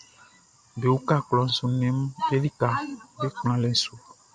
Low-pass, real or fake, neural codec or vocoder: 9.9 kHz; real; none